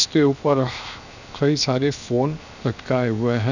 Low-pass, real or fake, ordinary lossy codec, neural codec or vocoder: 7.2 kHz; fake; none; codec, 16 kHz, 0.7 kbps, FocalCodec